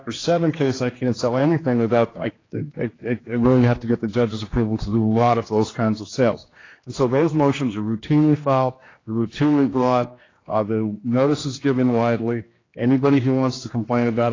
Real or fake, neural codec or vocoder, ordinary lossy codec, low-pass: fake; codec, 16 kHz, 2 kbps, X-Codec, HuBERT features, trained on general audio; AAC, 32 kbps; 7.2 kHz